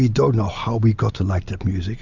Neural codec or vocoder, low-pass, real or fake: none; 7.2 kHz; real